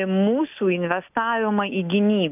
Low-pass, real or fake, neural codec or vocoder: 3.6 kHz; real; none